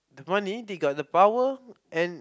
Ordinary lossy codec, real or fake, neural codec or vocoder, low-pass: none; real; none; none